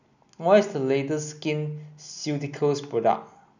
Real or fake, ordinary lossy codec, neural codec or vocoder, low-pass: real; none; none; 7.2 kHz